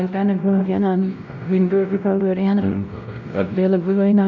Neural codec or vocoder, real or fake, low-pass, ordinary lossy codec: codec, 16 kHz, 0.5 kbps, X-Codec, WavLM features, trained on Multilingual LibriSpeech; fake; 7.2 kHz; none